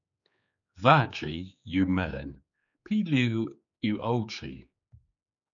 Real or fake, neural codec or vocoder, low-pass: fake; codec, 16 kHz, 4 kbps, X-Codec, HuBERT features, trained on general audio; 7.2 kHz